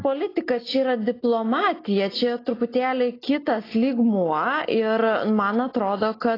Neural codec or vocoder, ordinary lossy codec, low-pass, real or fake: none; AAC, 24 kbps; 5.4 kHz; real